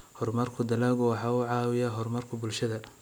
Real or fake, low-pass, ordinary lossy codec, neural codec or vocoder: real; none; none; none